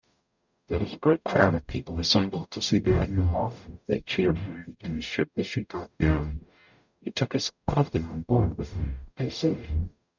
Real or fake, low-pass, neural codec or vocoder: fake; 7.2 kHz; codec, 44.1 kHz, 0.9 kbps, DAC